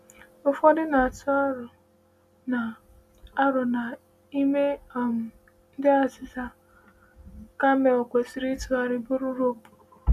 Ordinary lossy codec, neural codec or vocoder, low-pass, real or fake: none; none; 14.4 kHz; real